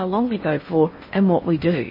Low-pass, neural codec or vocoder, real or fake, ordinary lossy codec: 5.4 kHz; codec, 16 kHz in and 24 kHz out, 0.8 kbps, FocalCodec, streaming, 65536 codes; fake; MP3, 24 kbps